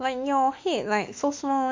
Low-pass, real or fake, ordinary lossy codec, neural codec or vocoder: 7.2 kHz; fake; MP3, 48 kbps; autoencoder, 48 kHz, 32 numbers a frame, DAC-VAE, trained on Japanese speech